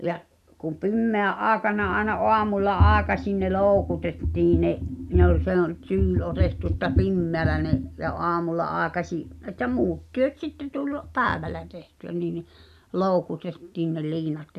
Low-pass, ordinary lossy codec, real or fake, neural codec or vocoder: 14.4 kHz; none; real; none